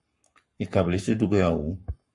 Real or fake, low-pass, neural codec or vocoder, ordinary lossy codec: fake; 10.8 kHz; codec, 44.1 kHz, 7.8 kbps, Pupu-Codec; MP3, 48 kbps